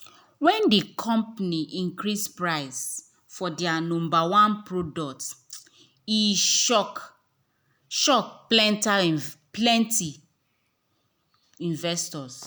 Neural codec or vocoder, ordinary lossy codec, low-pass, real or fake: none; none; none; real